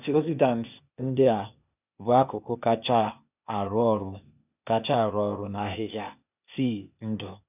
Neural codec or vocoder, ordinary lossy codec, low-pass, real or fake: codec, 16 kHz, 0.8 kbps, ZipCodec; none; 3.6 kHz; fake